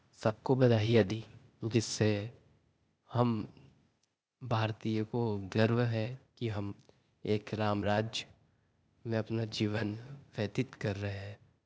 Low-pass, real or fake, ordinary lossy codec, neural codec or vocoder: none; fake; none; codec, 16 kHz, 0.8 kbps, ZipCodec